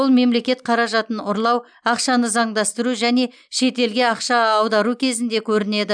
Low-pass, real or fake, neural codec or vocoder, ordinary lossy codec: 9.9 kHz; real; none; none